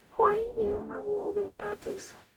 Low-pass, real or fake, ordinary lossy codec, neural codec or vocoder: 19.8 kHz; fake; none; codec, 44.1 kHz, 0.9 kbps, DAC